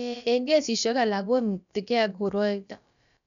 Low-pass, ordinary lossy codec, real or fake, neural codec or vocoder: 7.2 kHz; none; fake; codec, 16 kHz, about 1 kbps, DyCAST, with the encoder's durations